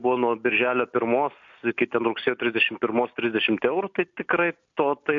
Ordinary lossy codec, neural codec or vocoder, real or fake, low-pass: AAC, 64 kbps; none; real; 7.2 kHz